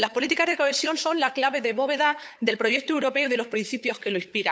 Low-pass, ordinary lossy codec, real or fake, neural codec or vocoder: none; none; fake; codec, 16 kHz, 8 kbps, FunCodec, trained on LibriTTS, 25 frames a second